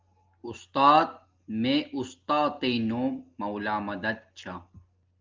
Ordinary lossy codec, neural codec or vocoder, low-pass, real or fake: Opus, 32 kbps; none; 7.2 kHz; real